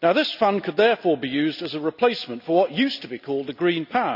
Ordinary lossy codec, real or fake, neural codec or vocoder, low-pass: none; real; none; 5.4 kHz